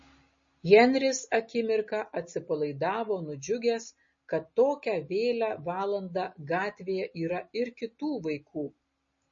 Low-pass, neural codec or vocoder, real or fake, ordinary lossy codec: 7.2 kHz; none; real; MP3, 32 kbps